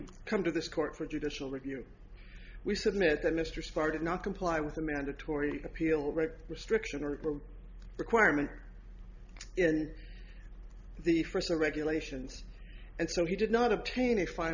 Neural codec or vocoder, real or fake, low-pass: none; real; 7.2 kHz